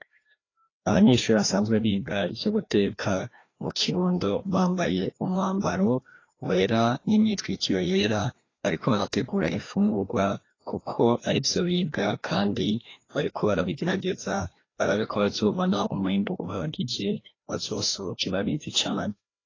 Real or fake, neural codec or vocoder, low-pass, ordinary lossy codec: fake; codec, 16 kHz, 1 kbps, FreqCodec, larger model; 7.2 kHz; AAC, 32 kbps